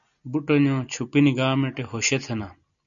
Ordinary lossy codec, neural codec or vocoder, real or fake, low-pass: MP3, 96 kbps; none; real; 7.2 kHz